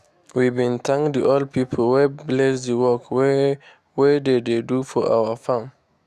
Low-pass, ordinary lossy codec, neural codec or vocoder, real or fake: 14.4 kHz; Opus, 64 kbps; autoencoder, 48 kHz, 128 numbers a frame, DAC-VAE, trained on Japanese speech; fake